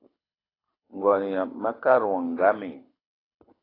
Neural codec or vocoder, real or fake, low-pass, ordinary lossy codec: codec, 24 kHz, 6 kbps, HILCodec; fake; 5.4 kHz; AAC, 24 kbps